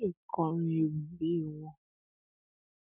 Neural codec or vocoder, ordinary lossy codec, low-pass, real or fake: none; none; 3.6 kHz; real